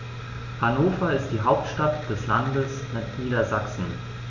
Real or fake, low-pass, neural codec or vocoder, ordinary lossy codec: real; 7.2 kHz; none; none